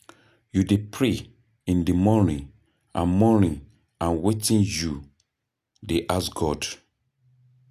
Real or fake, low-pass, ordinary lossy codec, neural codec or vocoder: real; 14.4 kHz; none; none